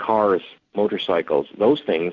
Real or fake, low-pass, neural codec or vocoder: real; 7.2 kHz; none